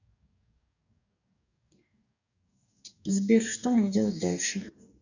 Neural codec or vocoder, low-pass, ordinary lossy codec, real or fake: codec, 44.1 kHz, 2.6 kbps, DAC; 7.2 kHz; none; fake